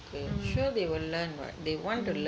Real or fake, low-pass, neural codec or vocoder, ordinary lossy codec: real; none; none; none